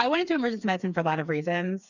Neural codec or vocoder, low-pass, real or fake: codec, 16 kHz, 4 kbps, FreqCodec, smaller model; 7.2 kHz; fake